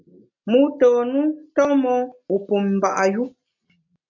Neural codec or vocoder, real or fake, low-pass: none; real; 7.2 kHz